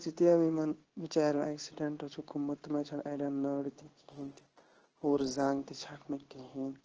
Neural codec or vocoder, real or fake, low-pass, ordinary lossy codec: codec, 16 kHz in and 24 kHz out, 1 kbps, XY-Tokenizer; fake; 7.2 kHz; Opus, 16 kbps